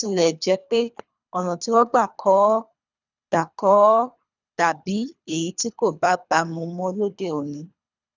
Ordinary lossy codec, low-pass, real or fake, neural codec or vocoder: none; 7.2 kHz; fake; codec, 24 kHz, 3 kbps, HILCodec